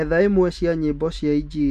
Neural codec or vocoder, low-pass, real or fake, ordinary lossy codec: none; 14.4 kHz; real; none